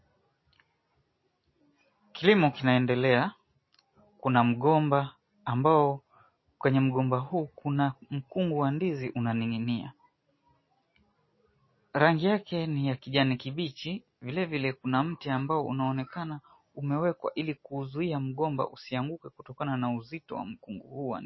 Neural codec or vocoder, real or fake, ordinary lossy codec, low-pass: none; real; MP3, 24 kbps; 7.2 kHz